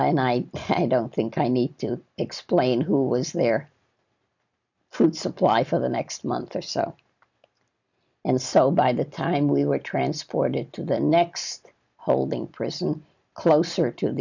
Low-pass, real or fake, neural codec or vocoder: 7.2 kHz; real; none